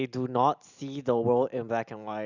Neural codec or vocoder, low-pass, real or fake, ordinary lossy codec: none; 7.2 kHz; real; Opus, 64 kbps